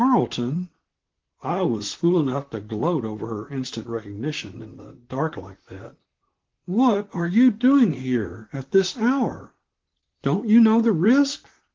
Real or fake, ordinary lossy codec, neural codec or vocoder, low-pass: fake; Opus, 16 kbps; vocoder, 44.1 kHz, 128 mel bands, Pupu-Vocoder; 7.2 kHz